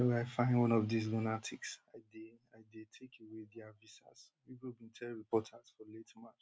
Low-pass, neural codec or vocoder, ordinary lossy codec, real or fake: none; none; none; real